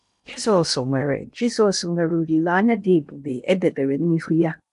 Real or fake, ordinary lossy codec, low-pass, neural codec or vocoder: fake; none; 10.8 kHz; codec, 16 kHz in and 24 kHz out, 0.8 kbps, FocalCodec, streaming, 65536 codes